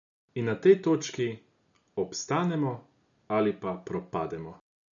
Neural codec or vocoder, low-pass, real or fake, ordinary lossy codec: none; 7.2 kHz; real; none